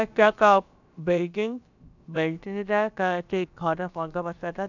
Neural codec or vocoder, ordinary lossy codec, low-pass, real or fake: codec, 16 kHz, about 1 kbps, DyCAST, with the encoder's durations; none; 7.2 kHz; fake